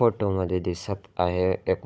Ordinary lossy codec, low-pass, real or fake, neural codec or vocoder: none; none; fake; codec, 16 kHz, 16 kbps, FunCodec, trained on Chinese and English, 50 frames a second